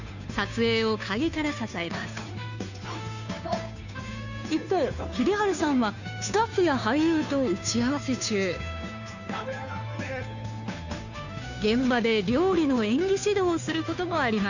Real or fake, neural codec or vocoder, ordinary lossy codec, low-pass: fake; codec, 16 kHz, 2 kbps, FunCodec, trained on Chinese and English, 25 frames a second; none; 7.2 kHz